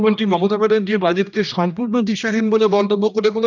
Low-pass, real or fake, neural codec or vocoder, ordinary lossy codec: 7.2 kHz; fake; codec, 16 kHz, 1 kbps, X-Codec, HuBERT features, trained on general audio; none